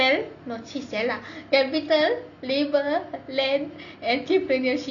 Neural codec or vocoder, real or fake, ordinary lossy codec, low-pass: none; real; none; 7.2 kHz